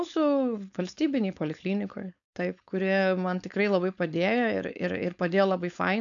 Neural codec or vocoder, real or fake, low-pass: codec, 16 kHz, 4.8 kbps, FACodec; fake; 7.2 kHz